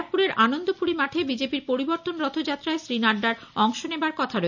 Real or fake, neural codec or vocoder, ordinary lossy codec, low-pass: real; none; none; 7.2 kHz